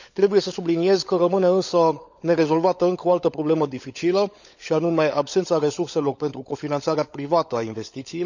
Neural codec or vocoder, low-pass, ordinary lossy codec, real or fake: codec, 16 kHz, 16 kbps, FunCodec, trained on LibriTTS, 50 frames a second; 7.2 kHz; none; fake